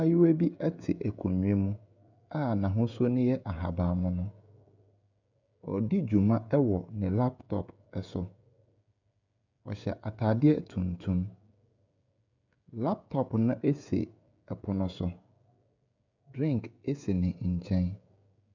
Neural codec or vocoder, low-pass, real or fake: codec, 16 kHz, 16 kbps, FreqCodec, smaller model; 7.2 kHz; fake